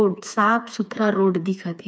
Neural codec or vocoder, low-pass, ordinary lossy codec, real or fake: codec, 16 kHz, 4 kbps, FreqCodec, smaller model; none; none; fake